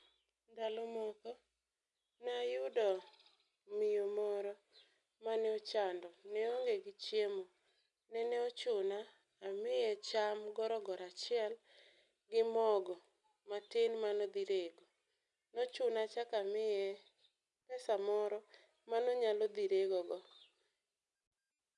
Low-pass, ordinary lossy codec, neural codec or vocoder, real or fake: 10.8 kHz; none; none; real